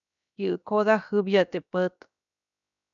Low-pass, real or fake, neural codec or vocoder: 7.2 kHz; fake; codec, 16 kHz, 0.7 kbps, FocalCodec